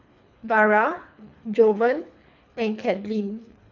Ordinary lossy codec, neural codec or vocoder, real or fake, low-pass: none; codec, 24 kHz, 3 kbps, HILCodec; fake; 7.2 kHz